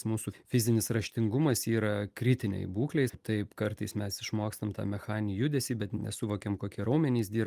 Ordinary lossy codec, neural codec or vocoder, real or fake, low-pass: Opus, 32 kbps; none; real; 14.4 kHz